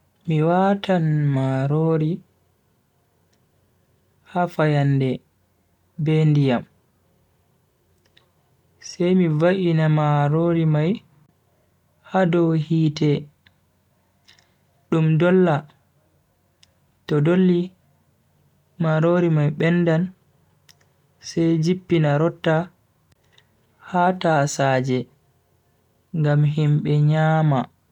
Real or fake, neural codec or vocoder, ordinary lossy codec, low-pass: real; none; none; 19.8 kHz